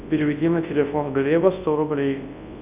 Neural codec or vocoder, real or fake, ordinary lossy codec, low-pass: codec, 24 kHz, 0.9 kbps, WavTokenizer, large speech release; fake; Opus, 64 kbps; 3.6 kHz